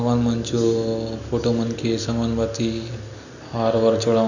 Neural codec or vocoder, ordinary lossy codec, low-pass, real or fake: none; none; 7.2 kHz; real